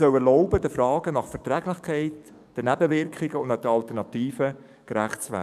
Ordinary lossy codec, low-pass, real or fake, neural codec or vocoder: none; 14.4 kHz; fake; codec, 44.1 kHz, 7.8 kbps, DAC